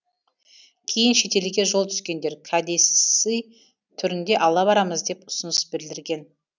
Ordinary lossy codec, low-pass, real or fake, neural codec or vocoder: none; 7.2 kHz; real; none